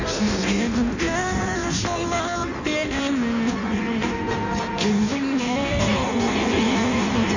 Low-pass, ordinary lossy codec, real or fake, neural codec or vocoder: 7.2 kHz; none; fake; codec, 16 kHz in and 24 kHz out, 0.6 kbps, FireRedTTS-2 codec